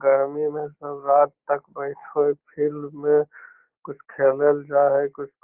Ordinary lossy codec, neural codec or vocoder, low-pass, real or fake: Opus, 16 kbps; none; 3.6 kHz; real